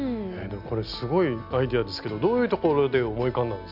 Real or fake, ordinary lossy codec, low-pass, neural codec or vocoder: real; none; 5.4 kHz; none